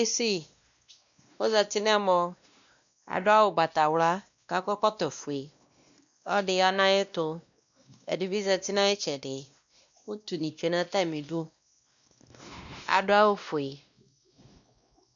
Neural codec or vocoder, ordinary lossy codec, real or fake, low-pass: codec, 16 kHz, 1 kbps, X-Codec, WavLM features, trained on Multilingual LibriSpeech; MP3, 96 kbps; fake; 7.2 kHz